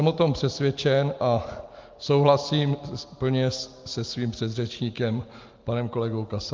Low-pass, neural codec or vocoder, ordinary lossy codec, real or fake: 7.2 kHz; none; Opus, 32 kbps; real